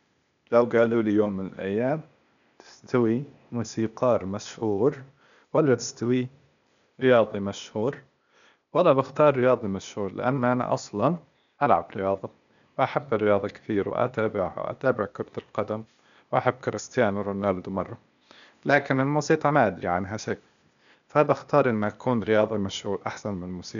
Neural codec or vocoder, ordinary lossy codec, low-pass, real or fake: codec, 16 kHz, 0.8 kbps, ZipCodec; MP3, 96 kbps; 7.2 kHz; fake